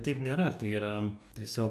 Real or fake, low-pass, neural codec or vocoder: fake; 14.4 kHz; codec, 44.1 kHz, 2.6 kbps, DAC